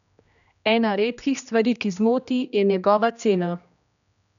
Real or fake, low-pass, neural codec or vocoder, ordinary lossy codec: fake; 7.2 kHz; codec, 16 kHz, 1 kbps, X-Codec, HuBERT features, trained on general audio; none